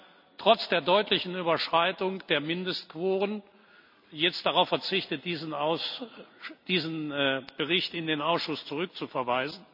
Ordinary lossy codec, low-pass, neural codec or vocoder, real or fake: none; 5.4 kHz; none; real